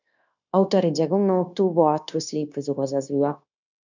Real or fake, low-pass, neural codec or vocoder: fake; 7.2 kHz; codec, 16 kHz, 0.9 kbps, LongCat-Audio-Codec